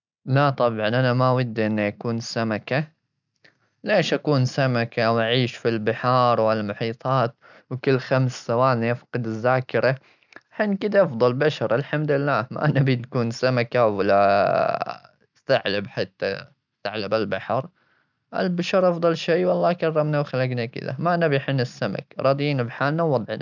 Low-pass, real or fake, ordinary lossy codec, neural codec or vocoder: 7.2 kHz; real; none; none